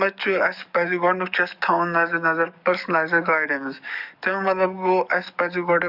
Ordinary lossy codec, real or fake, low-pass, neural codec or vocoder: none; fake; 5.4 kHz; codec, 44.1 kHz, 7.8 kbps, DAC